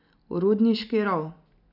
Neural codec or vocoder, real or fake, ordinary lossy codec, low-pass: none; real; none; 5.4 kHz